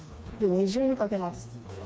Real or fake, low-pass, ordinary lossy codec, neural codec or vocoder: fake; none; none; codec, 16 kHz, 2 kbps, FreqCodec, smaller model